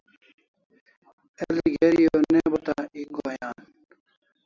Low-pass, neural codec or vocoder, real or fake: 7.2 kHz; none; real